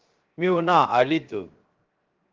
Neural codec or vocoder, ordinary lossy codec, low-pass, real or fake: codec, 16 kHz, 0.7 kbps, FocalCodec; Opus, 32 kbps; 7.2 kHz; fake